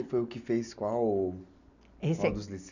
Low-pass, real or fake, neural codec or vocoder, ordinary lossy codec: 7.2 kHz; real; none; none